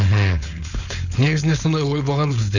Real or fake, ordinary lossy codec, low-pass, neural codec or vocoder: fake; none; 7.2 kHz; codec, 16 kHz, 4.8 kbps, FACodec